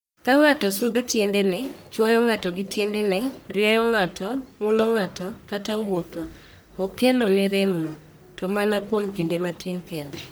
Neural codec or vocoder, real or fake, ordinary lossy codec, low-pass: codec, 44.1 kHz, 1.7 kbps, Pupu-Codec; fake; none; none